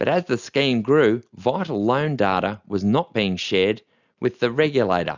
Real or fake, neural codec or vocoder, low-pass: real; none; 7.2 kHz